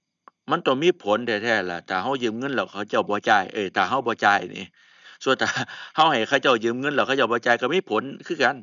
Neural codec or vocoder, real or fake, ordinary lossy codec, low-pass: none; real; none; 7.2 kHz